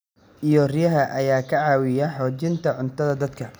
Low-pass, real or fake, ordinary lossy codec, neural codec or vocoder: none; real; none; none